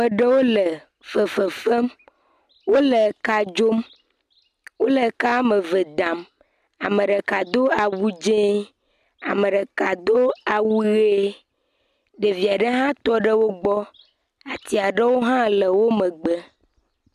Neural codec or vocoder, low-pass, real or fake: none; 14.4 kHz; real